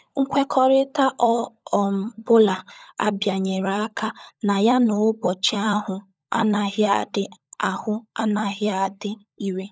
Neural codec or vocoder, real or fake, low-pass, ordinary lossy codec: codec, 16 kHz, 16 kbps, FunCodec, trained on LibriTTS, 50 frames a second; fake; none; none